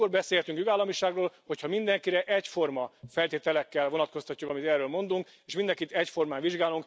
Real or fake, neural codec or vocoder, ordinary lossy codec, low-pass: real; none; none; none